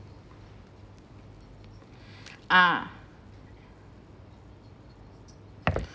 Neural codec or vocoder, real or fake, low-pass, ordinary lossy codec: none; real; none; none